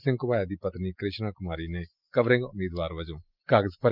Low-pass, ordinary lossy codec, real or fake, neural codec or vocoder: 5.4 kHz; Opus, 24 kbps; real; none